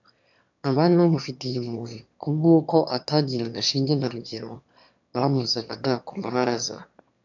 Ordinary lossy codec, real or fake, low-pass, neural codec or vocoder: MP3, 64 kbps; fake; 7.2 kHz; autoencoder, 22.05 kHz, a latent of 192 numbers a frame, VITS, trained on one speaker